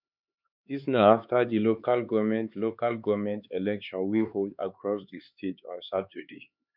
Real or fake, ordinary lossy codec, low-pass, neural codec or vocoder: fake; none; 5.4 kHz; codec, 16 kHz, 4 kbps, X-Codec, HuBERT features, trained on LibriSpeech